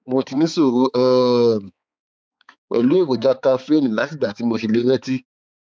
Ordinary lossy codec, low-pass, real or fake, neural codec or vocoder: none; none; fake; codec, 16 kHz, 4 kbps, X-Codec, HuBERT features, trained on balanced general audio